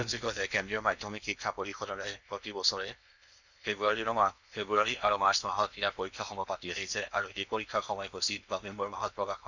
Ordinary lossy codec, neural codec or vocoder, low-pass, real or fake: none; codec, 16 kHz in and 24 kHz out, 0.8 kbps, FocalCodec, streaming, 65536 codes; 7.2 kHz; fake